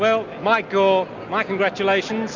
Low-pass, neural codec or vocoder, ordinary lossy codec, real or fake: 7.2 kHz; none; MP3, 64 kbps; real